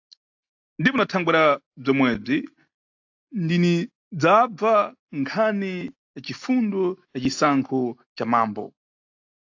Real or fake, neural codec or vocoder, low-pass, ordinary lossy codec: real; none; 7.2 kHz; AAC, 48 kbps